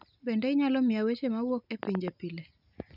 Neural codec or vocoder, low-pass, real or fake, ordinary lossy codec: none; 5.4 kHz; real; none